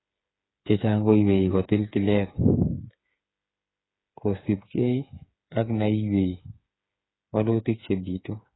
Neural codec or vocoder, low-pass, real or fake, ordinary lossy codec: codec, 16 kHz, 8 kbps, FreqCodec, smaller model; 7.2 kHz; fake; AAC, 16 kbps